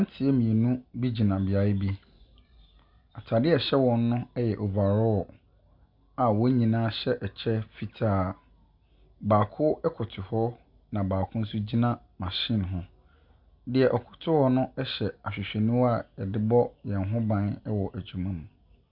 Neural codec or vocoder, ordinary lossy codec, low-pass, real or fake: none; Opus, 64 kbps; 5.4 kHz; real